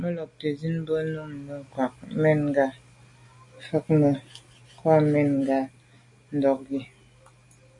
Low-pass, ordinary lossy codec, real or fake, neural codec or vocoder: 10.8 kHz; MP3, 48 kbps; real; none